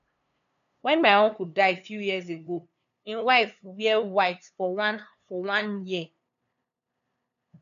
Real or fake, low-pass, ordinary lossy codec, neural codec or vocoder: fake; 7.2 kHz; none; codec, 16 kHz, 2 kbps, FunCodec, trained on LibriTTS, 25 frames a second